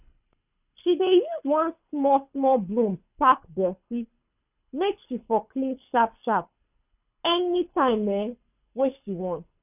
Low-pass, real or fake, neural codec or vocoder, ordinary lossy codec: 3.6 kHz; fake; codec, 24 kHz, 6 kbps, HILCodec; none